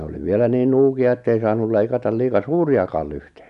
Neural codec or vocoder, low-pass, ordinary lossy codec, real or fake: none; 10.8 kHz; none; real